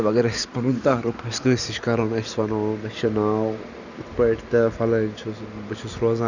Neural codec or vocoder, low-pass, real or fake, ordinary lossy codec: none; 7.2 kHz; real; none